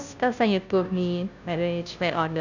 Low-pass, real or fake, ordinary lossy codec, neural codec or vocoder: 7.2 kHz; fake; none; codec, 16 kHz, 0.5 kbps, FunCodec, trained on Chinese and English, 25 frames a second